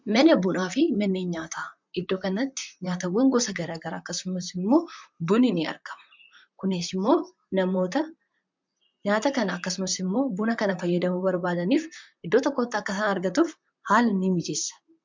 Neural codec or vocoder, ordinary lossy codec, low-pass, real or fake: vocoder, 44.1 kHz, 128 mel bands, Pupu-Vocoder; MP3, 64 kbps; 7.2 kHz; fake